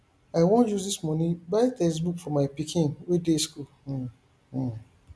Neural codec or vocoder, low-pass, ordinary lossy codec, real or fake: none; none; none; real